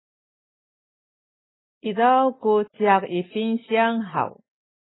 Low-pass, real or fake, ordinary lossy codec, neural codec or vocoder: 7.2 kHz; real; AAC, 16 kbps; none